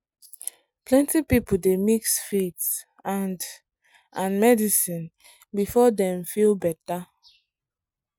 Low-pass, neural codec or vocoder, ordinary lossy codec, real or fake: none; none; none; real